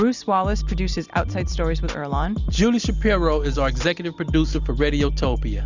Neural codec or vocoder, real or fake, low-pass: none; real; 7.2 kHz